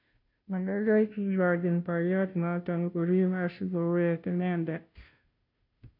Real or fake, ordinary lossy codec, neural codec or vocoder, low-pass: fake; none; codec, 16 kHz, 0.5 kbps, FunCodec, trained on Chinese and English, 25 frames a second; 5.4 kHz